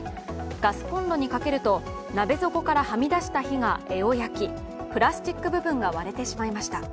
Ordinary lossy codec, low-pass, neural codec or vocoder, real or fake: none; none; none; real